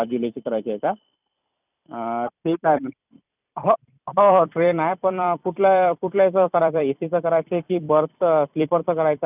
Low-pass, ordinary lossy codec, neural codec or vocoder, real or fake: 3.6 kHz; none; vocoder, 44.1 kHz, 128 mel bands every 256 samples, BigVGAN v2; fake